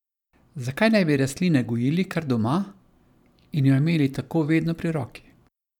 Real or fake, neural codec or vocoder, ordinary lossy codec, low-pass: fake; vocoder, 44.1 kHz, 128 mel bands every 512 samples, BigVGAN v2; none; 19.8 kHz